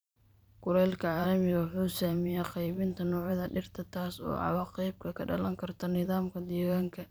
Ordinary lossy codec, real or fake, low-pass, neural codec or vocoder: none; fake; none; vocoder, 44.1 kHz, 128 mel bands every 512 samples, BigVGAN v2